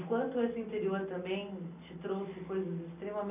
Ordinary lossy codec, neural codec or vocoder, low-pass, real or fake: none; none; 3.6 kHz; real